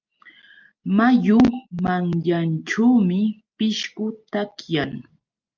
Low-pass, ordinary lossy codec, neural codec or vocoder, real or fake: 7.2 kHz; Opus, 24 kbps; none; real